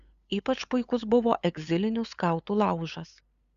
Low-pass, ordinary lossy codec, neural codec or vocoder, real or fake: 7.2 kHz; Opus, 64 kbps; codec, 16 kHz, 8 kbps, FunCodec, trained on LibriTTS, 25 frames a second; fake